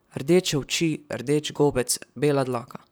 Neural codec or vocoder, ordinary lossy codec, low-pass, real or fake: vocoder, 44.1 kHz, 128 mel bands, Pupu-Vocoder; none; none; fake